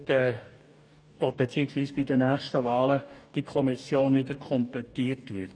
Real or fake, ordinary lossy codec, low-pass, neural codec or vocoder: fake; none; 9.9 kHz; codec, 44.1 kHz, 2.6 kbps, DAC